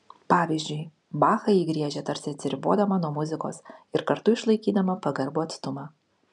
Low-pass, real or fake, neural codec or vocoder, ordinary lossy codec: 10.8 kHz; real; none; MP3, 96 kbps